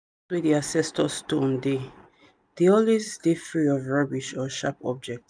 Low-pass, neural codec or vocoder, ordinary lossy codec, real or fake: 9.9 kHz; none; none; real